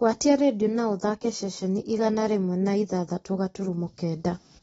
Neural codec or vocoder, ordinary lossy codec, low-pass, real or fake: none; AAC, 24 kbps; 19.8 kHz; real